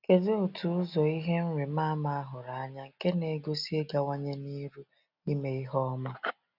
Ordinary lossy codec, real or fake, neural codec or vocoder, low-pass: none; real; none; 5.4 kHz